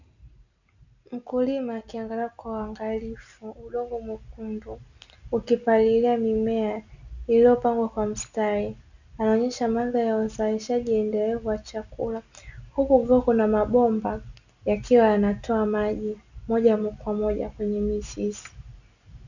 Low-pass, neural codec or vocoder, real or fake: 7.2 kHz; none; real